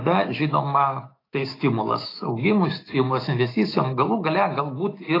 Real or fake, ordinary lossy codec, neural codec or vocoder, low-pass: fake; AAC, 24 kbps; autoencoder, 48 kHz, 128 numbers a frame, DAC-VAE, trained on Japanese speech; 5.4 kHz